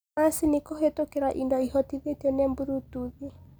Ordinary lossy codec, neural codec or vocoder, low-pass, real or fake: none; none; none; real